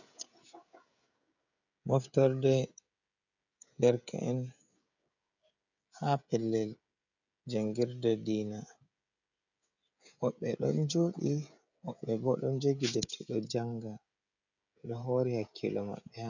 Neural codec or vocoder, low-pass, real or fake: codec, 16 kHz, 16 kbps, FreqCodec, smaller model; 7.2 kHz; fake